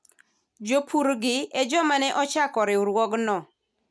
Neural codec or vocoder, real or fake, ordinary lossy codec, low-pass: none; real; none; none